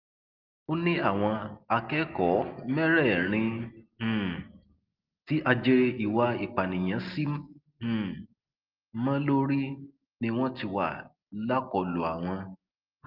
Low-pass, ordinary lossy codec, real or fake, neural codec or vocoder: 5.4 kHz; Opus, 32 kbps; real; none